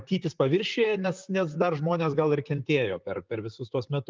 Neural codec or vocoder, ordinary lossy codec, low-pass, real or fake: vocoder, 44.1 kHz, 128 mel bands, Pupu-Vocoder; Opus, 24 kbps; 7.2 kHz; fake